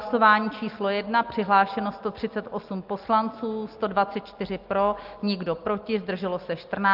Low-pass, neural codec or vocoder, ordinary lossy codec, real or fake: 5.4 kHz; none; Opus, 24 kbps; real